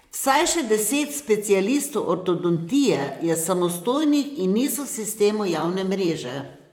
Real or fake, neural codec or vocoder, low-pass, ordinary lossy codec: fake; vocoder, 44.1 kHz, 128 mel bands, Pupu-Vocoder; 19.8 kHz; MP3, 96 kbps